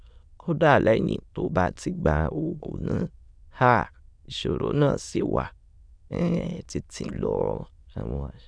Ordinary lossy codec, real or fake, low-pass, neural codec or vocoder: Opus, 64 kbps; fake; 9.9 kHz; autoencoder, 22.05 kHz, a latent of 192 numbers a frame, VITS, trained on many speakers